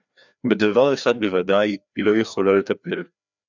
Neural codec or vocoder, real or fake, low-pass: codec, 16 kHz, 2 kbps, FreqCodec, larger model; fake; 7.2 kHz